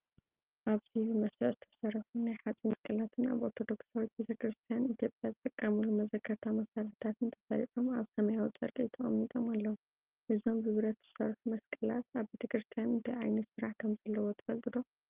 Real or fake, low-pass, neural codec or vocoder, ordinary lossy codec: real; 3.6 kHz; none; Opus, 32 kbps